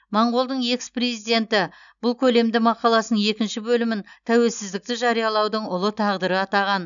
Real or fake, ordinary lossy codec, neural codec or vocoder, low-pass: real; none; none; 7.2 kHz